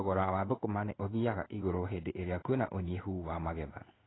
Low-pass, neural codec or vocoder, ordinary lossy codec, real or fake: 7.2 kHz; codec, 16 kHz in and 24 kHz out, 1 kbps, XY-Tokenizer; AAC, 16 kbps; fake